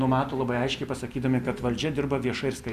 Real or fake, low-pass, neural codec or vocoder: real; 14.4 kHz; none